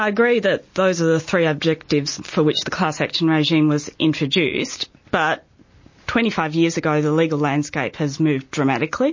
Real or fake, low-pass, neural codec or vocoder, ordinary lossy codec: real; 7.2 kHz; none; MP3, 32 kbps